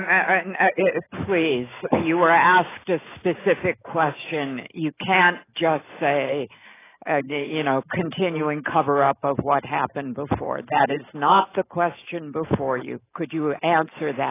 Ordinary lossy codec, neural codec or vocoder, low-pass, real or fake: AAC, 16 kbps; autoencoder, 48 kHz, 128 numbers a frame, DAC-VAE, trained on Japanese speech; 3.6 kHz; fake